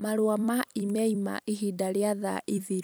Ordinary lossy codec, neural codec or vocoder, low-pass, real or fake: none; vocoder, 44.1 kHz, 128 mel bands every 256 samples, BigVGAN v2; none; fake